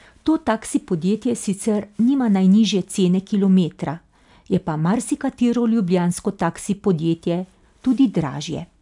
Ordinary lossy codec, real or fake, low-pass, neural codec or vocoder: none; real; 10.8 kHz; none